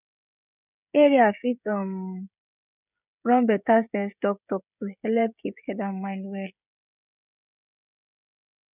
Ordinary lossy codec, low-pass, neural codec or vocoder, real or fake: none; 3.6 kHz; codec, 16 kHz, 16 kbps, FreqCodec, smaller model; fake